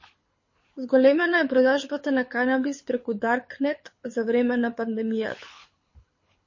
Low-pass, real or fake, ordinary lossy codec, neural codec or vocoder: 7.2 kHz; fake; MP3, 32 kbps; codec, 24 kHz, 6 kbps, HILCodec